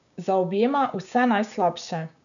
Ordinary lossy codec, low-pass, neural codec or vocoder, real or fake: none; 7.2 kHz; codec, 16 kHz, 6 kbps, DAC; fake